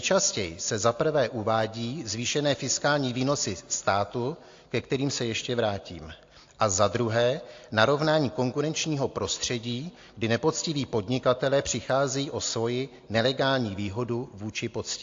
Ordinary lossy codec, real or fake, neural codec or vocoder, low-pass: AAC, 48 kbps; real; none; 7.2 kHz